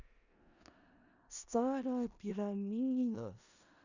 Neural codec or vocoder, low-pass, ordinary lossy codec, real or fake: codec, 16 kHz in and 24 kHz out, 0.4 kbps, LongCat-Audio-Codec, four codebook decoder; 7.2 kHz; none; fake